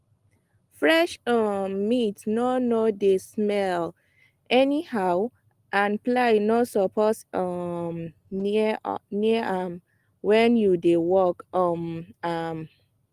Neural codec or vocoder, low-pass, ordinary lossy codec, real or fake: none; 14.4 kHz; Opus, 24 kbps; real